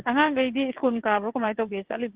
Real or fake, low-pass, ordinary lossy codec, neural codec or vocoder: fake; 3.6 kHz; Opus, 16 kbps; vocoder, 22.05 kHz, 80 mel bands, WaveNeXt